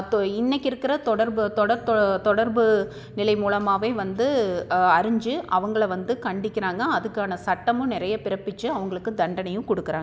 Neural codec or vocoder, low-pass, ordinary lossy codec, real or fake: none; none; none; real